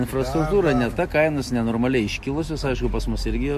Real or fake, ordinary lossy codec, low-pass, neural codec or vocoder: real; MP3, 64 kbps; 14.4 kHz; none